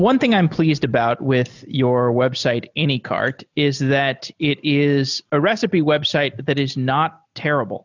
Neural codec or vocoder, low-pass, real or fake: none; 7.2 kHz; real